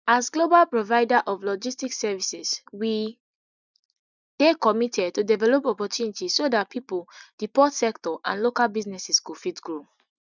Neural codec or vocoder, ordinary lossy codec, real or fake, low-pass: none; none; real; 7.2 kHz